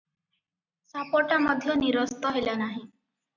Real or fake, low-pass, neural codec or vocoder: real; 7.2 kHz; none